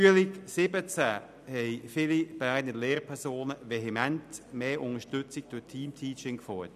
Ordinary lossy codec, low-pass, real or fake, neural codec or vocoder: none; 14.4 kHz; real; none